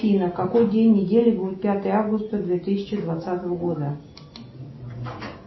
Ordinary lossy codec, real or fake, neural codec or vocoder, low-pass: MP3, 24 kbps; real; none; 7.2 kHz